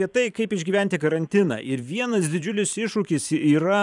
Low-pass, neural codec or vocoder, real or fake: 10.8 kHz; vocoder, 44.1 kHz, 128 mel bands every 512 samples, BigVGAN v2; fake